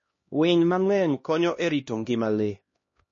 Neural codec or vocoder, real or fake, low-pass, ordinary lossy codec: codec, 16 kHz, 1 kbps, X-Codec, HuBERT features, trained on LibriSpeech; fake; 7.2 kHz; MP3, 32 kbps